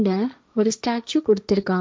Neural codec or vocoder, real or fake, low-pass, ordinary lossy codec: codec, 16 kHz, 1.1 kbps, Voila-Tokenizer; fake; none; none